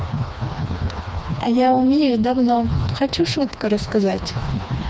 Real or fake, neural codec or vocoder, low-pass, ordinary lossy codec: fake; codec, 16 kHz, 2 kbps, FreqCodec, smaller model; none; none